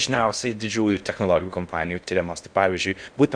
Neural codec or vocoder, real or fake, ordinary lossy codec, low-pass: codec, 16 kHz in and 24 kHz out, 0.6 kbps, FocalCodec, streaming, 4096 codes; fake; Opus, 64 kbps; 9.9 kHz